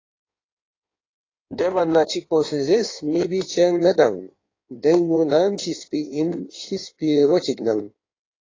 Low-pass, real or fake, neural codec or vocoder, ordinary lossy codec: 7.2 kHz; fake; codec, 16 kHz in and 24 kHz out, 1.1 kbps, FireRedTTS-2 codec; AAC, 32 kbps